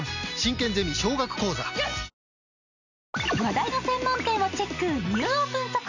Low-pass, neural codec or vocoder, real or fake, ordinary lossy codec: 7.2 kHz; none; real; none